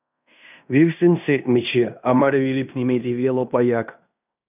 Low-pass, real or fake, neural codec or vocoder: 3.6 kHz; fake; codec, 16 kHz in and 24 kHz out, 0.9 kbps, LongCat-Audio-Codec, fine tuned four codebook decoder